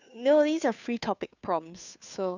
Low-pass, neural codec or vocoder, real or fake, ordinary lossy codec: 7.2 kHz; codec, 16 kHz, 2 kbps, FunCodec, trained on Chinese and English, 25 frames a second; fake; none